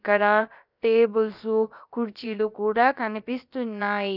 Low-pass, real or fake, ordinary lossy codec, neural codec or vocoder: 5.4 kHz; fake; none; codec, 16 kHz, about 1 kbps, DyCAST, with the encoder's durations